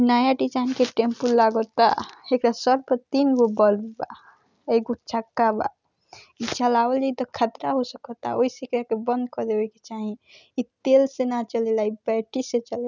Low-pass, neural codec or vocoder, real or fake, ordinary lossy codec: 7.2 kHz; none; real; none